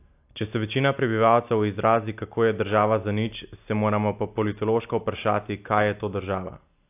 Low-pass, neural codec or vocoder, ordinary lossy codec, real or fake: 3.6 kHz; none; AAC, 32 kbps; real